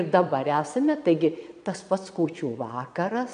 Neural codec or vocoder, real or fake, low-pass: vocoder, 22.05 kHz, 80 mel bands, Vocos; fake; 9.9 kHz